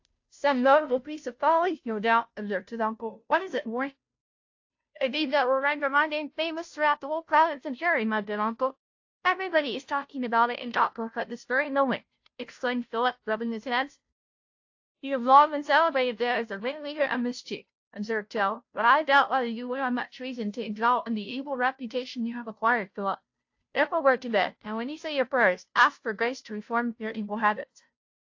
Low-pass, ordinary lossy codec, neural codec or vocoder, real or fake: 7.2 kHz; AAC, 48 kbps; codec, 16 kHz, 0.5 kbps, FunCodec, trained on Chinese and English, 25 frames a second; fake